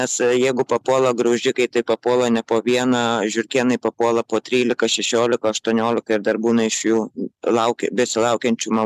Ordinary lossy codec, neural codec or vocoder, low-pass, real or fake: MP3, 96 kbps; none; 14.4 kHz; real